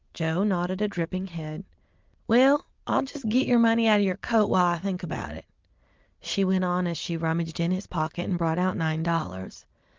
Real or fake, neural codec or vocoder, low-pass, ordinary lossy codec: real; none; 7.2 kHz; Opus, 24 kbps